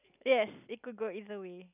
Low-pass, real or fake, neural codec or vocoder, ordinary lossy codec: 3.6 kHz; real; none; none